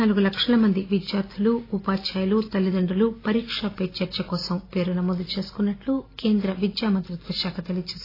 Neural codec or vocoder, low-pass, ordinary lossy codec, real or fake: none; 5.4 kHz; AAC, 24 kbps; real